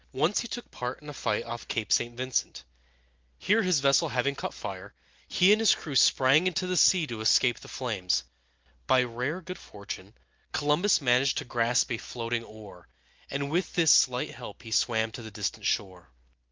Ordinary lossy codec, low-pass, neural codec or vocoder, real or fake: Opus, 24 kbps; 7.2 kHz; none; real